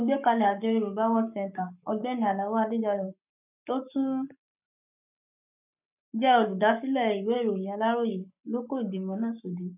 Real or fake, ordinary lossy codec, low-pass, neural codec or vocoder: fake; none; 3.6 kHz; codec, 44.1 kHz, 7.8 kbps, Pupu-Codec